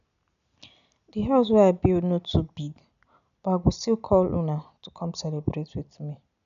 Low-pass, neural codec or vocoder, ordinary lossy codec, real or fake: 7.2 kHz; none; none; real